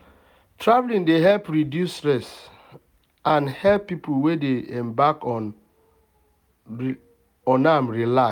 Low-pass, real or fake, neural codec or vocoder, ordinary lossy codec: 19.8 kHz; real; none; none